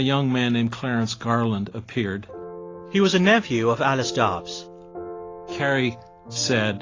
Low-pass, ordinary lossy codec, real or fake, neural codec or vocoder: 7.2 kHz; AAC, 32 kbps; real; none